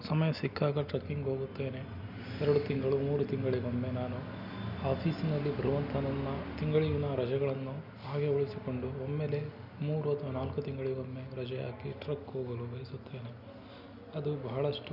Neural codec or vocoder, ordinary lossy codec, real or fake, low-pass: none; none; real; 5.4 kHz